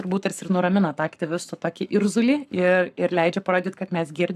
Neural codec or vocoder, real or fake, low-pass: codec, 44.1 kHz, 7.8 kbps, Pupu-Codec; fake; 14.4 kHz